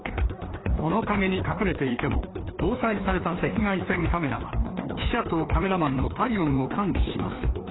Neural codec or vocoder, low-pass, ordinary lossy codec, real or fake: codec, 16 kHz, 2 kbps, FreqCodec, larger model; 7.2 kHz; AAC, 16 kbps; fake